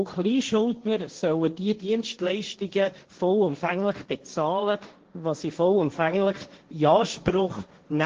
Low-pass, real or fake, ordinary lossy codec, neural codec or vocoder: 7.2 kHz; fake; Opus, 16 kbps; codec, 16 kHz, 1.1 kbps, Voila-Tokenizer